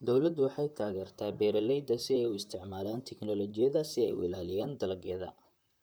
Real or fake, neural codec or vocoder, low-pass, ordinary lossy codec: fake; vocoder, 44.1 kHz, 128 mel bands, Pupu-Vocoder; none; none